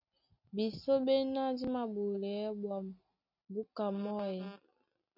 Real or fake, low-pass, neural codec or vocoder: real; 5.4 kHz; none